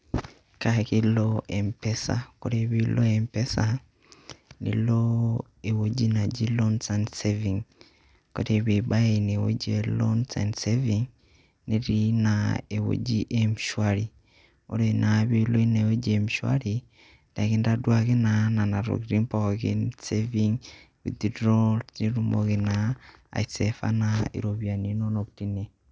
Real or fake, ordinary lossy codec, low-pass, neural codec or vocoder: real; none; none; none